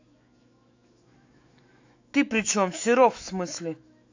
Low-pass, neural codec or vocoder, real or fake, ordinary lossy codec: 7.2 kHz; autoencoder, 48 kHz, 128 numbers a frame, DAC-VAE, trained on Japanese speech; fake; AAC, 48 kbps